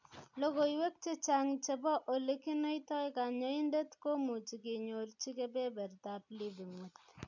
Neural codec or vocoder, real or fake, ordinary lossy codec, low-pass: none; real; MP3, 64 kbps; 7.2 kHz